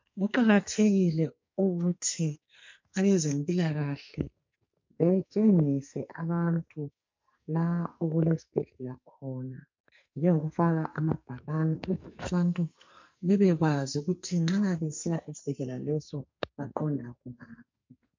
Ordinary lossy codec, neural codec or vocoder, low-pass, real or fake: MP3, 48 kbps; codec, 44.1 kHz, 2.6 kbps, SNAC; 7.2 kHz; fake